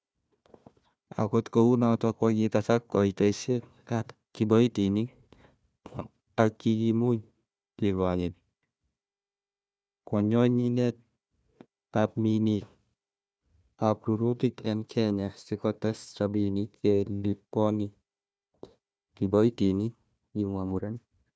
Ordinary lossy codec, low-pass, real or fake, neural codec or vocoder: none; none; fake; codec, 16 kHz, 1 kbps, FunCodec, trained on Chinese and English, 50 frames a second